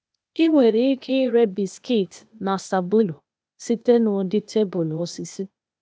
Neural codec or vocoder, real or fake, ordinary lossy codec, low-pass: codec, 16 kHz, 0.8 kbps, ZipCodec; fake; none; none